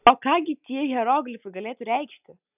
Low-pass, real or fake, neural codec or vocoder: 3.6 kHz; real; none